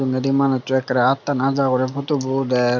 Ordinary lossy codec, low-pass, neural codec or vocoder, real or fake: none; 7.2 kHz; none; real